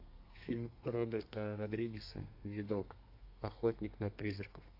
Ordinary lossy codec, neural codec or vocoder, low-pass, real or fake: AAC, 32 kbps; codec, 32 kHz, 1.9 kbps, SNAC; 5.4 kHz; fake